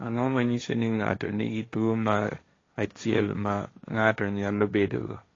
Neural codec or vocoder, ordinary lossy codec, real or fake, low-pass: codec, 16 kHz, 1.1 kbps, Voila-Tokenizer; AAC, 32 kbps; fake; 7.2 kHz